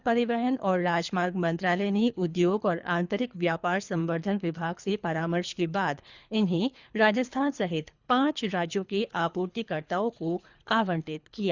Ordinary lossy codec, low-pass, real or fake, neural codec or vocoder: Opus, 64 kbps; 7.2 kHz; fake; codec, 24 kHz, 3 kbps, HILCodec